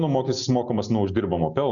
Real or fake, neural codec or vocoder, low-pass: real; none; 7.2 kHz